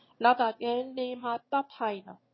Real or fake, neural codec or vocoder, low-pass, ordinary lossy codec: fake; autoencoder, 22.05 kHz, a latent of 192 numbers a frame, VITS, trained on one speaker; 7.2 kHz; MP3, 24 kbps